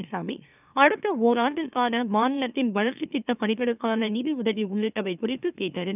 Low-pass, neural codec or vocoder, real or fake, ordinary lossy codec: 3.6 kHz; autoencoder, 44.1 kHz, a latent of 192 numbers a frame, MeloTTS; fake; none